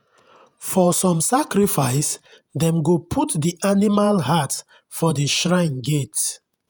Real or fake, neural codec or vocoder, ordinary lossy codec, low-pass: fake; vocoder, 48 kHz, 128 mel bands, Vocos; none; none